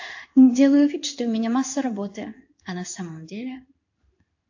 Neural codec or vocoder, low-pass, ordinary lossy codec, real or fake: codec, 16 kHz in and 24 kHz out, 1 kbps, XY-Tokenizer; 7.2 kHz; AAC, 48 kbps; fake